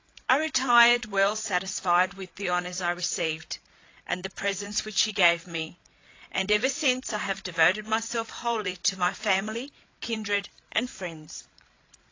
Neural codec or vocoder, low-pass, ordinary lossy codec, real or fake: codec, 16 kHz, 8 kbps, FreqCodec, larger model; 7.2 kHz; AAC, 32 kbps; fake